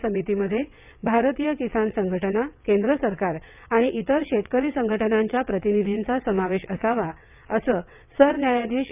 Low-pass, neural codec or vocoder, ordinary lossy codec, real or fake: 3.6 kHz; vocoder, 22.05 kHz, 80 mel bands, WaveNeXt; none; fake